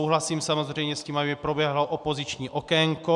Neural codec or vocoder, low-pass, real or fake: none; 10.8 kHz; real